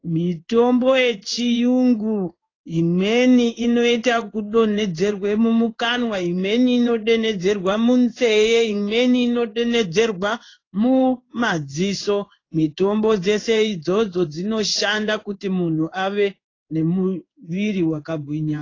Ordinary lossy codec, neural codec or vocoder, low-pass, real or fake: AAC, 32 kbps; codec, 16 kHz in and 24 kHz out, 1 kbps, XY-Tokenizer; 7.2 kHz; fake